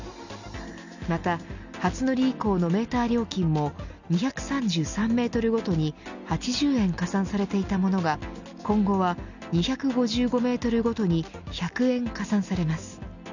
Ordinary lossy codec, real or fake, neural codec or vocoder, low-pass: none; real; none; 7.2 kHz